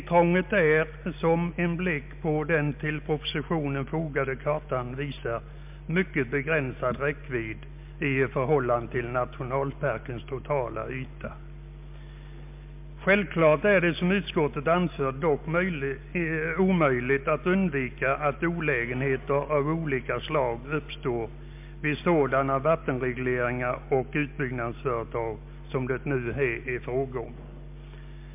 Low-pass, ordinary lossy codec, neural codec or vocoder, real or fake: 3.6 kHz; MP3, 32 kbps; none; real